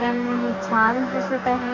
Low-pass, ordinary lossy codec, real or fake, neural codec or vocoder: 7.2 kHz; none; fake; codec, 44.1 kHz, 2.6 kbps, DAC